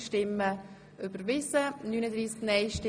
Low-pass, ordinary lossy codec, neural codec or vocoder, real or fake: none; none; none; real